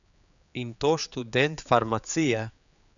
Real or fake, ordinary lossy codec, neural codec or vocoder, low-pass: fake; none; codec, 16 kHz, 4 kbps, X-Codec, HuBERT features, trained on general audio; 7.2 kHz